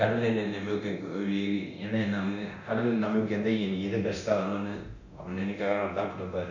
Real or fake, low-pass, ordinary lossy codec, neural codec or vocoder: fake; 7.2 kHz; none; codec, 24 kHz, 0.9 kbps, DualCodec